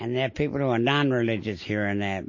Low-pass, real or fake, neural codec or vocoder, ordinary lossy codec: 7.2 kHz; real; none; MP3, 32 kbps